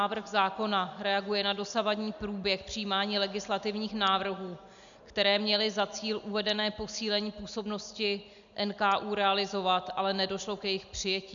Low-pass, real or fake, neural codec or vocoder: 7.2 kHz; real; none